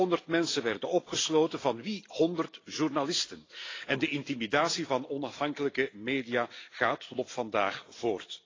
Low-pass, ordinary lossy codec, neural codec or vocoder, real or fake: 7.2 kHz; AAC, 32 kbps; none; real